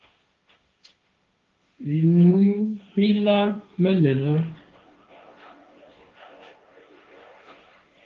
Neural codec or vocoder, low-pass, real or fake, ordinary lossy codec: codec, 16 kHz, 1.1 kbps, Voila-Tokenizer; 7.2 kHz; fake; Opus, 32 kbps